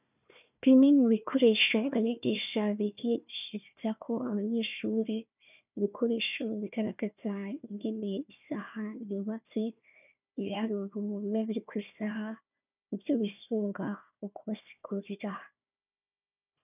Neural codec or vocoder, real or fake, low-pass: codec, 16 kHz, 1 kbps, FunCodec, trained on Chinese and English, 50 frames a second; fake; 3.6 kHz